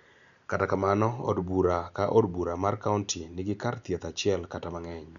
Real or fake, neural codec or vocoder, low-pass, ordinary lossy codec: real; none; 7.2 kHz; none